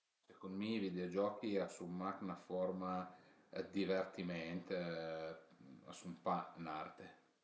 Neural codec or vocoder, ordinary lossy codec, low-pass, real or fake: none; none; none; real